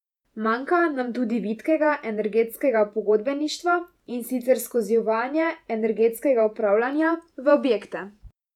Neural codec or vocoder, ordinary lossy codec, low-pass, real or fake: vocoder, 48 kHz, 128 mel bands, Vocos; none; 19.8 kHz; fake